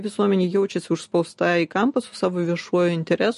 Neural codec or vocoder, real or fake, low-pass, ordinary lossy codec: none; real; 14.4 kHz; MP3, 48 kbps